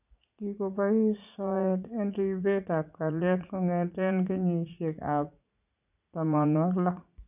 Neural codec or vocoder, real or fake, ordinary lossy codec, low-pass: vocoder, 44.1 kHz, 80 mel bands, Vocos; fake; none; 3.6 kHz